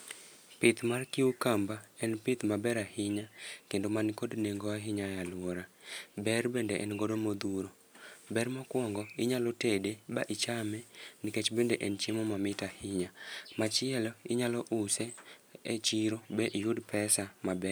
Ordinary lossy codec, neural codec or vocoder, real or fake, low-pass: none; none; real; none